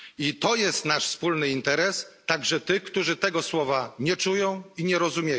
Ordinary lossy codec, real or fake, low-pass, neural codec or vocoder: none; real; none; none